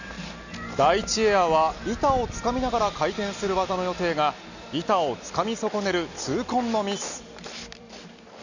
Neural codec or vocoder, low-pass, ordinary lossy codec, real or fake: none; 7.2 kHz; none; real